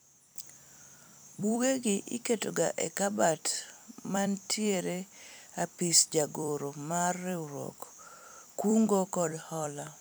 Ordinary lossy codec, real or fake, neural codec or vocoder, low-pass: none; fake; vocoder, 44.1 kHz, 128 mel bands every 256 samples, BigVGAN v2; none